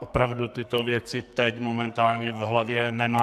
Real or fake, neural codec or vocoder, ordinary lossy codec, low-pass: fake; codec, 44.1 kHz, 2.6 kbps, SNAC; AAC, 96 kbps; 14.4 kHz